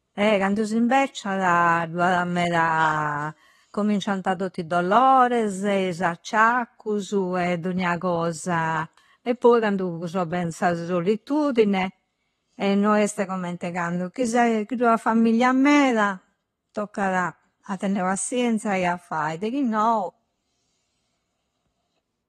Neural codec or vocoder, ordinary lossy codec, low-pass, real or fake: none; AAC, 32 kbps; 19.8 kHz; real